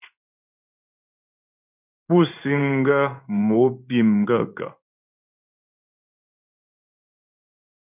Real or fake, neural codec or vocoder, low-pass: fake; codec, 16 kHz in and 24 kHz out, 1 kbps, XY-Tokenizer; 3.6 kHz